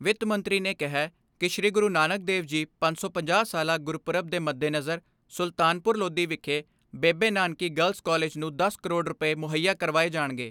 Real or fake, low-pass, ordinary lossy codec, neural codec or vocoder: real; 19.8 kHz; none; none